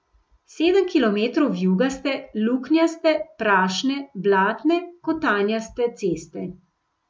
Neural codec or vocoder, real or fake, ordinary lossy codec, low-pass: none; real; none; none